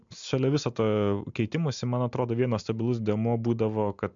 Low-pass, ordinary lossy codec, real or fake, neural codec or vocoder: 7.2 kHz; MP3, 64 kbps; real; none